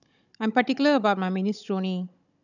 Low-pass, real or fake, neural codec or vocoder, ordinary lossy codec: 7.2 kHz; fake; codec, 16 kHz, 16 kbps, FunCodec, trained on Chinese and English, 50 frames a second; none